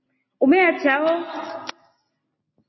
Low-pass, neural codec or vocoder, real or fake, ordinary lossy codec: 7.2 kHz; none; real; MP3, 24 kbps